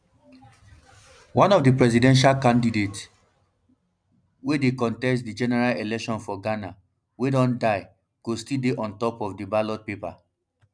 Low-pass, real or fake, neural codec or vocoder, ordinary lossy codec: 9.9 kHz; real; none; none